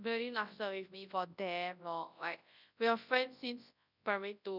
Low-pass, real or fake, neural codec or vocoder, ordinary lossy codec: 5.4 kHz; fake; codec, 24 kHz, 0.9 kbps, WavTokenizer, large speech release; none